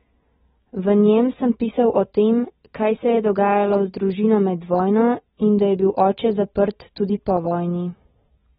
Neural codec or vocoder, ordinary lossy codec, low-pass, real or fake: none; AAC, 16 kbps; 9.9 kHz; real